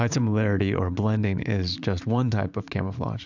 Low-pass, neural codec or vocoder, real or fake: 7.2 kHz; vocoder, 44.1 kHz, 80 mel bands, Vocos; fake